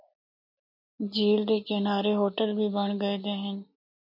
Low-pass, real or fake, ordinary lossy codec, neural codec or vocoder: 5.4 kHz; real; MP3, 32 kbps; none